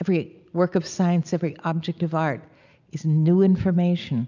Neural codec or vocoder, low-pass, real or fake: none; 7.2 kHz; real